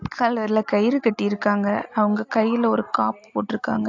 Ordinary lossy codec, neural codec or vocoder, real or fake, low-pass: AAC, 48 kbps; none; real; 7.2 kHz